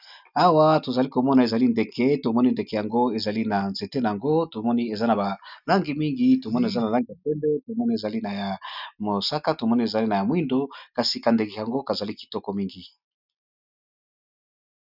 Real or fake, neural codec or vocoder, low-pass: real; none; 5.4 kHz